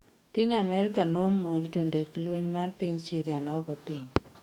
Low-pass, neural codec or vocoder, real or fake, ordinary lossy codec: 19.8 kHz; codec, 44.1 kHz, 2.6 kbps, DAC; fake; none